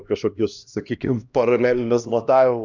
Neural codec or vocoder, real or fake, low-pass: codec, 16 kHz, 1 kbps, X-Codec, HuBERT features, trained on LibriSpeech; fake; 7.2 kHz